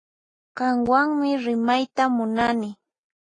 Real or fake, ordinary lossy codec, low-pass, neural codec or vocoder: real; AAC, 32 kbps; 9.9 kHz; none